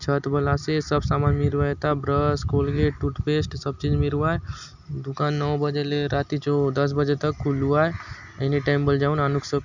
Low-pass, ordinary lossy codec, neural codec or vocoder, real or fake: 7.2 kHz; none; none; real